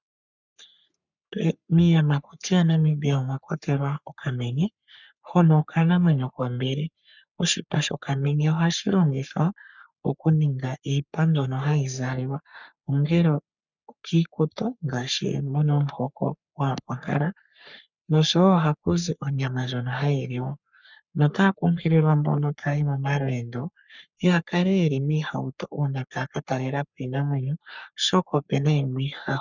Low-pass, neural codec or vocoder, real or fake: 7.2 kHz; codec, 44.1 kHz, 3.4 kbps, Pupu-Codec; fake